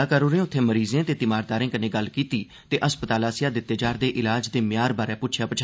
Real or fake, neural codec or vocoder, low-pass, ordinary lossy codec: real; none; none; none